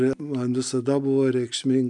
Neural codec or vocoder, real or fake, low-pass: none; real; 10.8 kHz